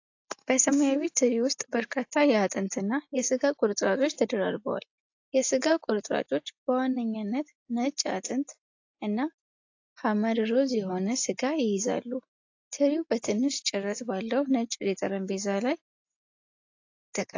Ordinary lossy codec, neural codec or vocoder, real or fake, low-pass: AAC, 48 kbps; none; real; 7.2 kHz